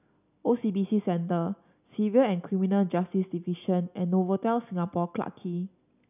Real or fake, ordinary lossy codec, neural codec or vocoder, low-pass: real; none; none; 3.6 kHz